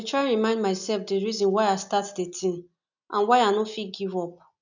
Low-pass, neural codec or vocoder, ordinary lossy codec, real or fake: 7.2 kHz; none; none; real